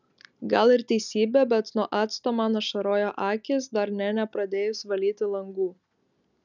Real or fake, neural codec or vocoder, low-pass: real; none; 7.2 kHz